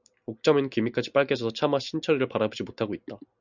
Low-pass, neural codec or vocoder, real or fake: 7.2 kHz; none; real